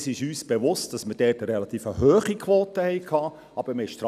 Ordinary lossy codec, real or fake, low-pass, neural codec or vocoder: none; real; 14.4 kHz; none